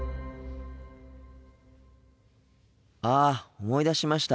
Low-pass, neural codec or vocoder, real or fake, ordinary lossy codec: none; none; real; none